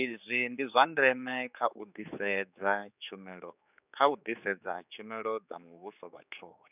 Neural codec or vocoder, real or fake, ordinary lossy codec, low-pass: codec, 16 kHz, 4 kbps, X-Codec, HuBERT features, trained on general audio; fake; none; 3.6 kHz